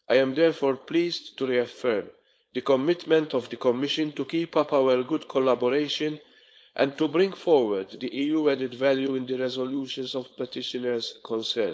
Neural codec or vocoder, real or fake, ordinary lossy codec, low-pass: codec, 16 kHz, 4.8 kbps, FACodec; fake; none; none